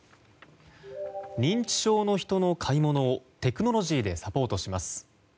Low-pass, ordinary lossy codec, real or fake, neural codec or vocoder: none; none; real; none